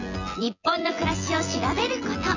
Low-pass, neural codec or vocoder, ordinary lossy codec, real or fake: 7.2 kHz; vocoder, 24 kHz, 100 mel bands, Vocos; none; fake